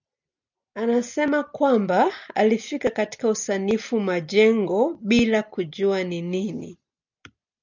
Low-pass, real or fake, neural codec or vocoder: 7.2 kHz; real; none